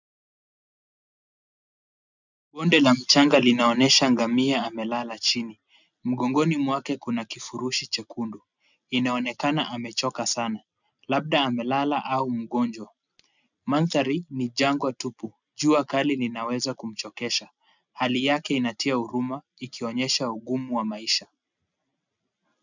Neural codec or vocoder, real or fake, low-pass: none; real; 7.2 kHz